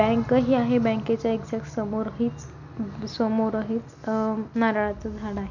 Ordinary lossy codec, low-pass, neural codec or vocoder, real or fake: none; 7.2 kHz; none; real